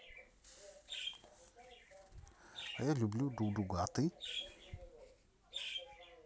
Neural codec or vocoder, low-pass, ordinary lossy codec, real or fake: none; none; none; real